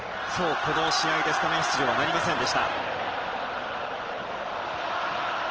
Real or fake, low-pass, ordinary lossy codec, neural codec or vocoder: real; 7.2 kHz; Opus, 16 kbps; none